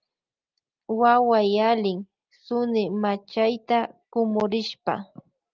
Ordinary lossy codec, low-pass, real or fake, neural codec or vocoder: Opus, 24 kbps; 7.2 kHz; real; none